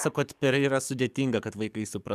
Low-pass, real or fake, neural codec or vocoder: 14.4 kHz; fake; codec, 44.1 kHz, 7.8 kbps, DAC